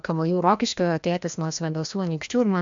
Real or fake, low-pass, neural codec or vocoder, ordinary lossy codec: fake; 7.2 kHz; codec, 16 kHz, 1 kbps, FreqCodec, larger model; MP3, 64 kbps